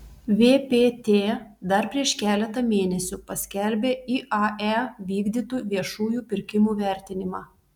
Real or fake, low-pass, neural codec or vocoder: real; 19.8 kHz; none